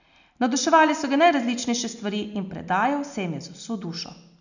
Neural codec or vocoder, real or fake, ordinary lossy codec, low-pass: none; real; none; 7.2 kHz